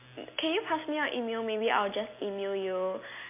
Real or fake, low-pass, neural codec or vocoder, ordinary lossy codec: real; 3.6 kHz; none; MP3, 24 kbps